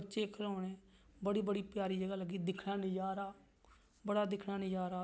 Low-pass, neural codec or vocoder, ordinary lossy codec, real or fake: none; none; none; real